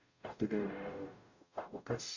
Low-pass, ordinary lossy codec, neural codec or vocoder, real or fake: 7.2 kHz; none; codec, 44.1 kHz, 0.9 kbps, DAC; fake